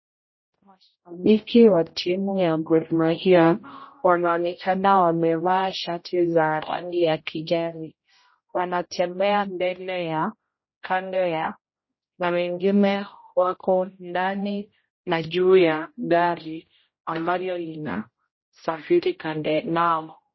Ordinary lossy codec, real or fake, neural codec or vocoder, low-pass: MP3, 24 kbps; fake; codec, 16 kHz, 0.5 kbps, X-Codec, HuBERT features, trained on general audio; 7.2 kHz